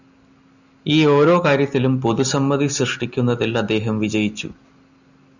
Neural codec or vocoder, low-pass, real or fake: none; 7.2 kHz; real